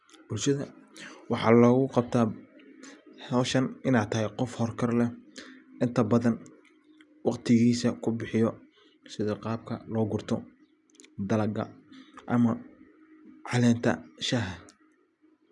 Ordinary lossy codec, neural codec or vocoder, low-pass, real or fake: none; none; 10.8 kHz; real